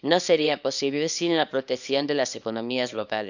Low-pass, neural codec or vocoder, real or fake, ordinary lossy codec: 7.2 kHz; codec, 24 kHz, 0.9 kbps, WavTokenizer, small release; fake; none